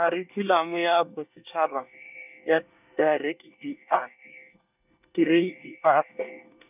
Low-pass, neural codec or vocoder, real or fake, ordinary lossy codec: 3.6 kHz; codec, 24 kHz, 1 kbps, SNAC; fake; none